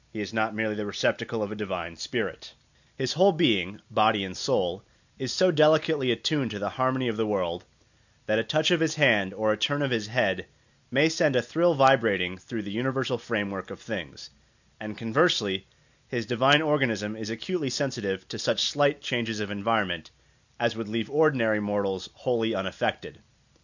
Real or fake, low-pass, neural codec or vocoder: real; 7.2 kHz; none